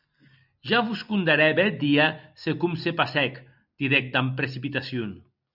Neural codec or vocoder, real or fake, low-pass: none; real; 5.4 kHz